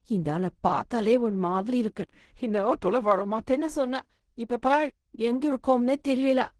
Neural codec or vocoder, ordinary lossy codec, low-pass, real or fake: codec, 16 kHz in and 24 kHz out, 0.4 kbps, LongCat-Audio-Codec, fine tuned four codebook decoder; Opus, 16 kbps; 10.8 kHz; fake